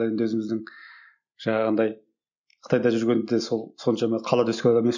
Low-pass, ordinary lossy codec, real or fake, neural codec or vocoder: 7.2 kHz; none; real; none